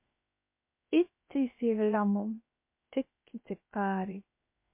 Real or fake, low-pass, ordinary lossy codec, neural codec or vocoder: fake; 3.6 kHz; MP3, 24 kbps; codec, 16 kHz, 0.7 kbps, FocalCodec